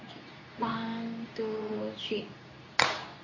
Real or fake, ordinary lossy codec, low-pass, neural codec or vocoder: fake; MP3, 32 kbps; 7.2 kHz; codec, 24 kHz, 0.9 kbps, WavTokenizer, medium speech release version 2